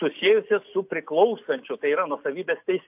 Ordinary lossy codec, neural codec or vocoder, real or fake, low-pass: AAC, 32 kbps; none; real; 3.6 kHz